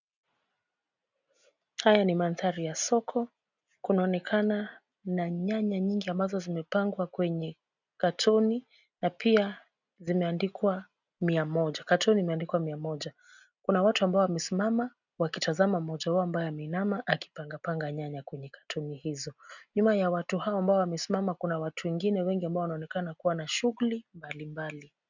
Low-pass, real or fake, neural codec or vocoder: 7.2 kHz; real; none